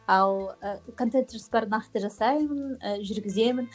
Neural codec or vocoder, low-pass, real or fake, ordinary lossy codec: none; none; real; none